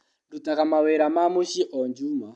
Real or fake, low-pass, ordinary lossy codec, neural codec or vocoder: real; none; none; none